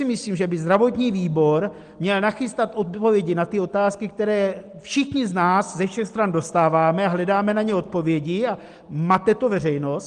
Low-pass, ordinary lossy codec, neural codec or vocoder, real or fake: 9.9 kHz; Opus, 24 kbps; none; real